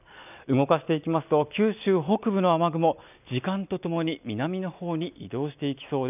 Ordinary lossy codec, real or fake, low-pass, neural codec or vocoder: none; real; 3.6 kHz; none